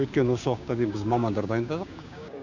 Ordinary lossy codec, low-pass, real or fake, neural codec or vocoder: none; 7.2 kHz; fake; codec, 16 kHz, 6 kbps, DAC